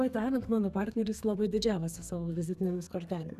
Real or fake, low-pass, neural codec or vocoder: fake; 14.4 kHz; codec, 32 kHz, 1.9 kbps, SNAC